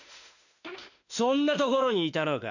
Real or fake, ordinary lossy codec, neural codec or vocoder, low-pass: fake; none; autoencoder, 48 kHz, 32 numbers a frame, DAC-VAE, trained on Japanese speech; 7.2 kHz